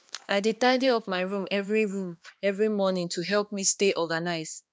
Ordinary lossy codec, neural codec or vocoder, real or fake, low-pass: none; codec, 16 kHz, 2 kbps, X-Codec, HuBERT features, trained on balanced general audio; fake; none